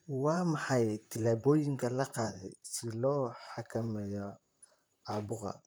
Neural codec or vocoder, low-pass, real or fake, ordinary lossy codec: vocoder, 44.1 kHz, 128 mel bands, Pupu-Vocoder; none; fake; none